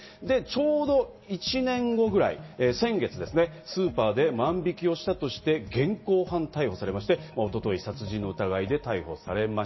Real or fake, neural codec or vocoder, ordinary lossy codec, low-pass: real; none; MP3, 24 kbps; 7.2 kHz